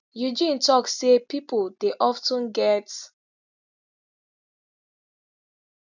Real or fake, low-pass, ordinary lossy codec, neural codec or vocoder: real; 7.2 kHz; none; none